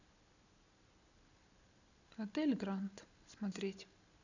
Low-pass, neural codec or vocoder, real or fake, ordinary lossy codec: 7.2 kHz; none; real; none